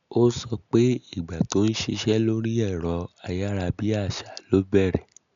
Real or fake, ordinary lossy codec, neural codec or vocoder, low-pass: real; none; none; 7.2 kHz